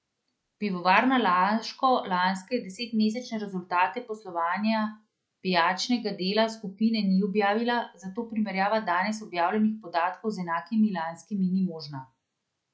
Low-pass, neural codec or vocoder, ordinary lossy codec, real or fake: none; none; none; real